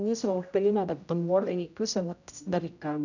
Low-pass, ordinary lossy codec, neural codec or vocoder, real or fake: 7.2 kHz; none; codec, 16 kHz, 0.5 kbps, X-Codec, HuBERT features, trained on general audio; fake